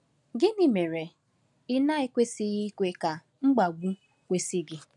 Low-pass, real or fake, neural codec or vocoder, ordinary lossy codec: 10.8 kHz; real; none; none